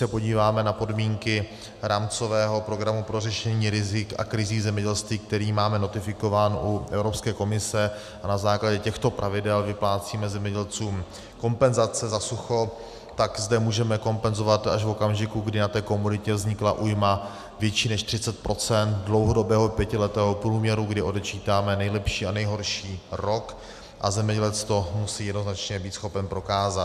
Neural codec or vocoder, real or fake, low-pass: none; real; 14.4 kHz